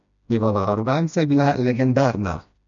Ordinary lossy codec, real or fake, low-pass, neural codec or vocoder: none; fake; 7.2 kHz; codec, 16 kHz, 1 kbps, FreqCodec, smaller model